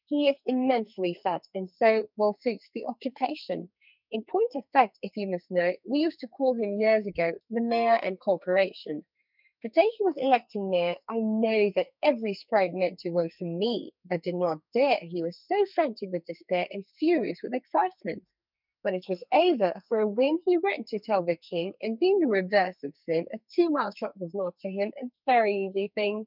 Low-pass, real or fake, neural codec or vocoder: 5.4 kHz; fake; codec, 44.1 kHz, 2.6 kbps, SNAC